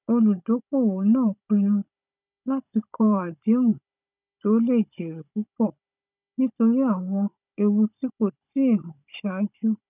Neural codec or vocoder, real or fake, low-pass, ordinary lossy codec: codec, 16 kHz, 16 kbps, FunCodec, trained on Chinese and English, 50 frames a second; fake; 3.6 kHz; none